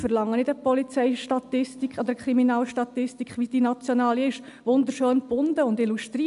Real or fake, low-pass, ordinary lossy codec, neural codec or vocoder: real; 10.8 kHz; AAC, 96 kbps; none